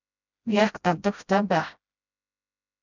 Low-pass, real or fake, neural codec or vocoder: 7.2 kHz; fake; codec, 16 kHz, 0.5 kbps, FreqCodec, smaller model